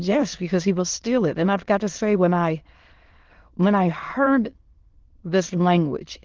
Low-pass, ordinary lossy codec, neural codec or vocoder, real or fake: 7.2 kHz; Opus, 16 kbps; autoencoder, 22.05 kHz, a latent of 192 numbers a frame, VITS, trained on many speakers; fake